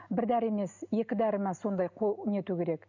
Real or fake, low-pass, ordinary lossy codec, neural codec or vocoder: real; 7.2 kHz; none; none